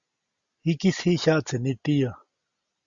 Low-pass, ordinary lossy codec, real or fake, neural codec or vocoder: 7.2 kHz; Opus, 64 kbps; real; none